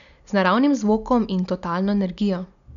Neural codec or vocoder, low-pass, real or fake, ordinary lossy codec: none; 7.2 kHz; real; none